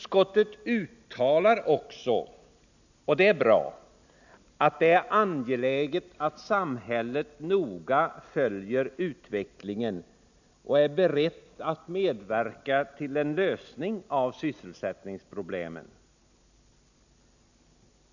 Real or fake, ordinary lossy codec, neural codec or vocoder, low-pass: real; none; none; 7.2 kHz